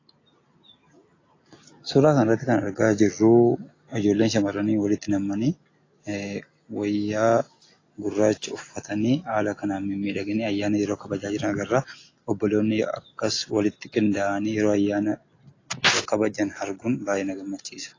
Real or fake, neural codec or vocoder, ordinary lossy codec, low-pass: real; none; AAC, 32 kbps; 7.2 kHz